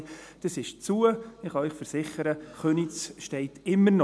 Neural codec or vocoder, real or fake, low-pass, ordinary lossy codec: none; real; none; none